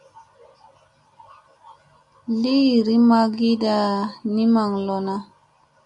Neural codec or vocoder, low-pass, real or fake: none; 10.8 kHz; real